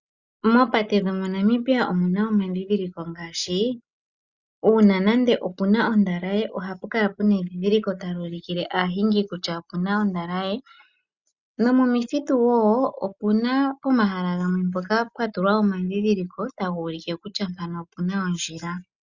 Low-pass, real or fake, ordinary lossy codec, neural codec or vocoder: 7.2 kHz; real; Opus, 64 kbps; none